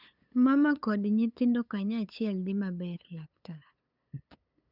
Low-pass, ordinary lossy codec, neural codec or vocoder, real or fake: 5.4 kHz; none; codec, 16 kHz, 8 kbps, FunCodec, trained on LibriTTS, 25 frames a second; fake